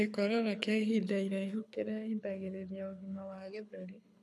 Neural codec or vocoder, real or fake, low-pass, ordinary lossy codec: codec, 24 kHz, 6 kbps, HILCodec; fake; none; none